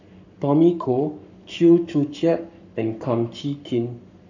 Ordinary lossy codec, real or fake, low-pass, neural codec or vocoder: none; fake; 7.2 kHz; codec, 44.1 kHz, 7.8 kbps, Pupu-Codec